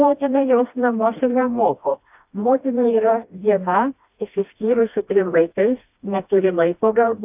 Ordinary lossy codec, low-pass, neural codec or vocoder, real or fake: AAC, 32 kbps; 3.6 kHz; codec, 16 kHz, 1 kbps, FreqCodec, smaller model; fake